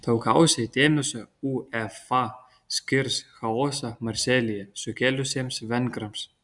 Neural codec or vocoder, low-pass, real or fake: none; 10.8 kHz; real